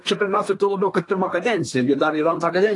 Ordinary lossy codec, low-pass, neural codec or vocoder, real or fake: AAC, 48 kbps; 10.8 kHz; codec, 24 kHz, 1 kbps, SNAC; fake